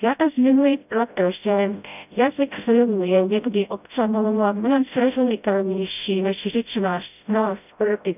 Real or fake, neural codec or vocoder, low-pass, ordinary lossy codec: fake; codec, 16 kHz, 0.5 kbps, FreqCodec, smaller model; 3.6 kHz; none